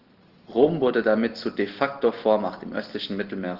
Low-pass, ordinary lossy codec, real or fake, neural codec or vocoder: 5.4 kHz; Opus, 16 kbps; real; none